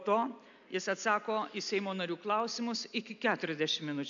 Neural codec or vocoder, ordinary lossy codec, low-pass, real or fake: none; AAC, 64 kbps; 7.2 kHz; real